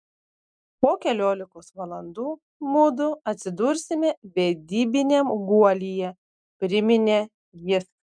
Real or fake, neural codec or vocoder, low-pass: real; none; 9.9 kHz